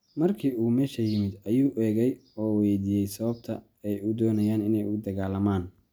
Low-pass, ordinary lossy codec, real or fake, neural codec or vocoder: none; none; real; none